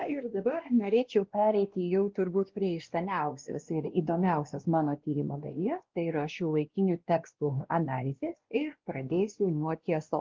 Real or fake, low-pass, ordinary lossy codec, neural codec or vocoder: fake; 7.2 kHz; Opus, 16 kbps; codec, 16 kHz, 1 kbps, X-Codec, WavLM features, trained on Multilingual LibriSpeech